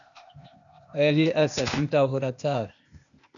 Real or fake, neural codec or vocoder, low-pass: fake; codec, 16 kHz, 0.8 kbps, ZipCodec; 7.2 kHz